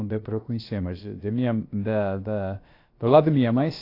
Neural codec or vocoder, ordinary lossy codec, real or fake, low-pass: codec, 16 kHz, about 1 kbps, DyCAST, with the encoder's durations; AAC, 32 kbps; fake; 5.4 kHz